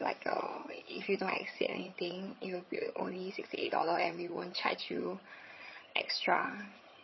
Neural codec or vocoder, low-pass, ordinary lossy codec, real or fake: vocoder, 22.05 kHz, 80 mel bands, HiFi-GAN; 7.2 kHz; MP3, 24 kbps; fake